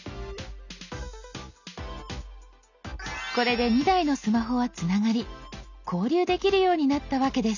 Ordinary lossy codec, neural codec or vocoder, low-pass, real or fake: none; none; 7.2 kHz; real